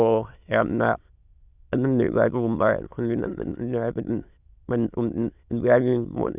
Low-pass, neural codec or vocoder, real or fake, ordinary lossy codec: 3.6 kHz; autoencoder, 22.05 kHz, a latent of 192 numbers a frame, VITS, trained on many speakers; fake; Opus, 64 kbps